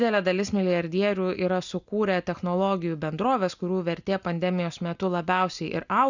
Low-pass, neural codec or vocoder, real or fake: 7.2 kHz; none; real